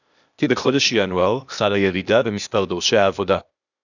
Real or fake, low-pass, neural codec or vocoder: fake; 7.2 kHz; codec, 16 kHz, 0.8 kbps, ZipCodec